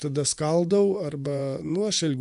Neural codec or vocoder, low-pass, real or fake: vocoder, 24 kHz, 100 mel bands, Vocos; 10.8 kHz; fake